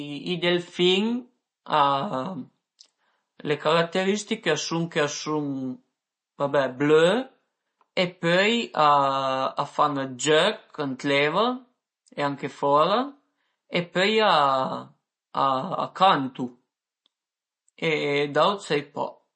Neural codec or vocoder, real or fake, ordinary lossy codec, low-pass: none; real; MP3, 32 kbps; 9.9 kHz